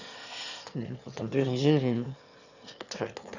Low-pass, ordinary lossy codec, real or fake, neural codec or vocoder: 7.2 kHz; none; fake; autoencoder, 22.05 kHz, a latent of 192 numbers a frame, VITS, trained on one speaker